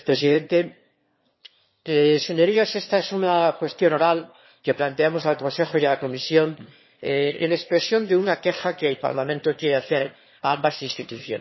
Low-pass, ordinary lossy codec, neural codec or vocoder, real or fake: 7.2 kHz; MP3, 24 kbps; autoencoder, 22.05 kHz, a latent of 192 numbers a frame, VITS, trained on one speaker; fake